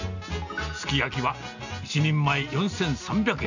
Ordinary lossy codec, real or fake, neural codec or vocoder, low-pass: none; real; none; 7.2 kHz